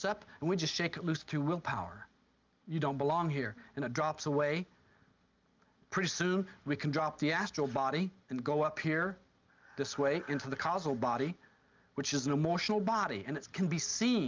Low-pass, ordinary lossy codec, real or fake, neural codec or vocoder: 7.2 kHz; Opus, 24 kbps; real; none